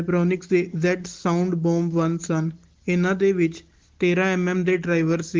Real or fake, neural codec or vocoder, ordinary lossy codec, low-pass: real; none; Opus, 16 kbps; 7.2 kHz